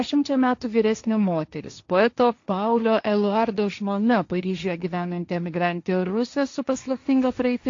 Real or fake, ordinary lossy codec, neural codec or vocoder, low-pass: fake; AAC, 48 kbps; codec, 16 kHz, 1.1 kbps, Voila-Tokenizer; 7.2 kHz